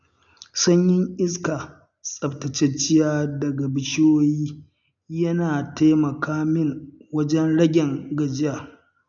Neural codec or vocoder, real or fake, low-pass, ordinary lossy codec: none; real; 7.2 kHz; none